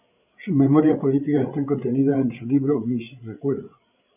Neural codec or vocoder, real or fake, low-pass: codec, 16 kHz, 8 kbps, FreqCodec, larger model; fake; 3.6 kHz